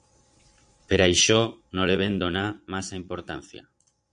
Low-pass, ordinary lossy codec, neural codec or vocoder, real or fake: 9.9 kHz; MP3, 64 kbps; vocoder, 22.05 kHz, 80 mel bands, Vocos; fake